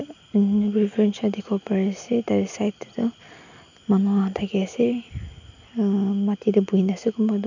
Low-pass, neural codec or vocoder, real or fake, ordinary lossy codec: 7.2 kHz; none; real; none